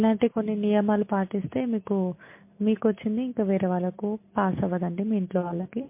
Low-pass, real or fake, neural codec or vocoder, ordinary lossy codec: 3.6 kHz; real; none; MP3, 24 kbps